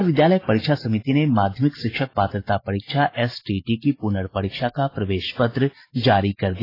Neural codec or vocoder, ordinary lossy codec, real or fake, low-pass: none; AAC, 24 kbps; real; 5.4 kHz